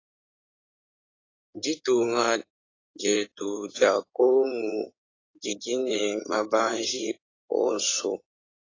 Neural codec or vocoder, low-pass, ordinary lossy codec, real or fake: codec, 16 kHz in and 24 kHz out, 2.2 kbps, FireRedTTS-2 codec; 7.2 kHz; AAC, 32 kbps; fake